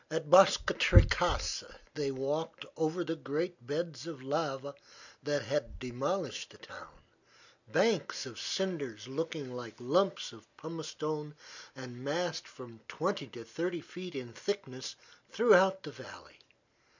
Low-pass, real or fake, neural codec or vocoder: 7.2 kHz; real; none